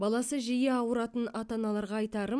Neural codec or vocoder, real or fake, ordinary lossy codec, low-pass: none; real; none; none